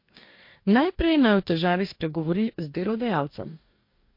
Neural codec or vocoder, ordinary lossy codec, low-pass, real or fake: codec, 44.1 kHz, 2.6 kbps, DAC; MP3, 32 kbps; 5.4 kHz; fake